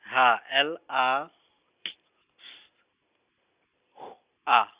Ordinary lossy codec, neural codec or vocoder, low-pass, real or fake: Opus, 64 kbps; none; 3.6 kHz; real